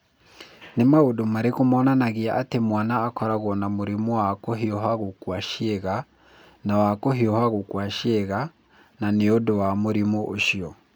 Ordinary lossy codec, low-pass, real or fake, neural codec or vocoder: none; none; real; none